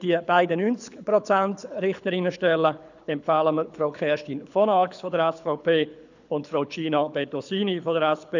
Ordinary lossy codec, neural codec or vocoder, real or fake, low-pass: none; codec, 24 kHz, 6 kbps, HILCodec; fake; 7.2 kHz